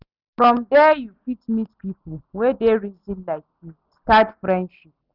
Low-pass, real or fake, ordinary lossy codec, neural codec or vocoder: 5.4 kHz; real; none; none